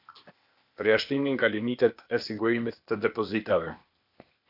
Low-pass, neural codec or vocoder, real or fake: 5.4 kHz; codec, 16 kHz, 0.8 kbps, ZipCodec; fake